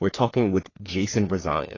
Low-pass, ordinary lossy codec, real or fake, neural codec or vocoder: 7.2 kHz; AAC, 32 kbps; fake; codec, 44.1 kHz, 3.4 kbps, Pupu-Codec